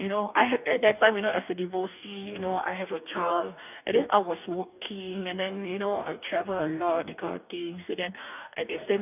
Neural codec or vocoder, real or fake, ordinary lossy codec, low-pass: codec, 44.1 kHz, 2.6 kbps, DAC; fake; none; 3.6 kHz